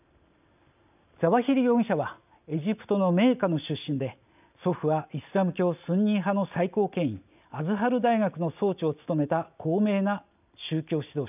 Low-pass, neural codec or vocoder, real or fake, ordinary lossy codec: 3.6 kHz; none; real; none